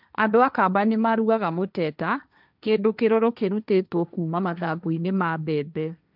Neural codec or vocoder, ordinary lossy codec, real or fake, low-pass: codec, 16 kHz, 1.1 kbps, Voila-Tokenizer; none; fake; 5.4 kHz